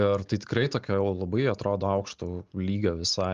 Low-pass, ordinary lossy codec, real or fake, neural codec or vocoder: 7.2 kHz; Opus, 32 kbps; real; none